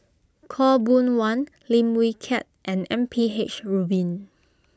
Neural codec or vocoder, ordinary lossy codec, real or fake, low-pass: none; none; real; none